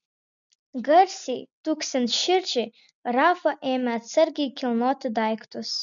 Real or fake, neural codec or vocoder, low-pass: real; none; 7.2 kHz